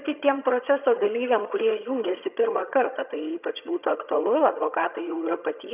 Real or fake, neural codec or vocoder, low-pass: fake; vocoder, 22.05 kHz, 80 mel bands, HiFi-GAN; 3.6 kHz